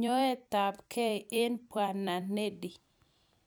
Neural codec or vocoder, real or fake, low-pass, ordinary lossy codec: vocoder, 44.1 kHz, 128 mel bands every 256 samples, BigVGAN v2; fake; none; none